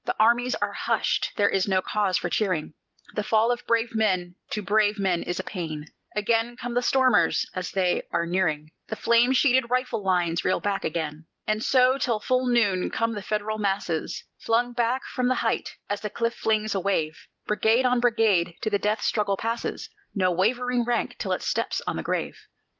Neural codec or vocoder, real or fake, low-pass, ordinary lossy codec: none; real; 7.2 kHz; Opus, 32 kbps